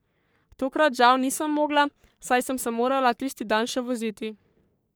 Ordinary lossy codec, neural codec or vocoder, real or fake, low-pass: none; codec, 44.1 kHz, 3.4 kbps, Pupu-Codec; fake; none